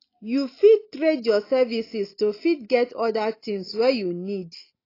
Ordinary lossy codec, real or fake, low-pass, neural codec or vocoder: AAC, 24 kbps; real; 5.4 kHz; none